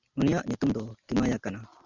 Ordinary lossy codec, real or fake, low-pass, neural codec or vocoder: Opus, 64 kbps; real; 7.2 kHz; none